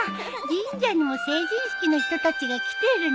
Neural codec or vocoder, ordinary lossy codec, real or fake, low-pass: none; none; real; none